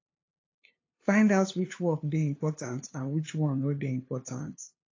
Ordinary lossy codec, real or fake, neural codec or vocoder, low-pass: AAC, 32 kbps; fake; codec, 16 kHz, 2 kbps, FunCodec, trained on LibriTTS, 25 frames a second; 7.2 kHz